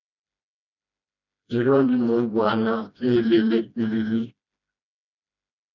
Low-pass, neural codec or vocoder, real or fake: 7.2 kHz; codec, 16 kHz, 1 kbps, FreqCodec, smaller model; fake